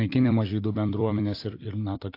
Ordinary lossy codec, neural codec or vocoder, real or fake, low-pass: AAC, 32 kbps; vocoder, 44.1 kHz, 128 mel bands every 256 samples, BigVGAN v2; fake; 5.4 kHz